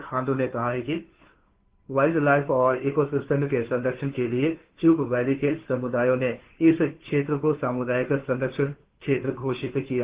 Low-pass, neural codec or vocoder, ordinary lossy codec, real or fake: 3.6 kHz; codec, 16 kHz, 2 kbps, FunCodec, trained on Chinese and English, 25 frames a second; Opus, 16 kbps; fake